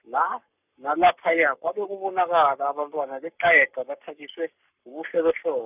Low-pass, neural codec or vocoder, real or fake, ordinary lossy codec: 3.6 kHz; codec, 44.1 kHz, 7.8 kbps, Pupu-Codec; fake; none